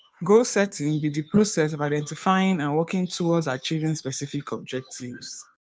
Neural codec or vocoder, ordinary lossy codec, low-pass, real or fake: codec, 16 kHz, 2 kbps, FunCodec, trained on Chinese and English, 25 frames a second; none; none; fake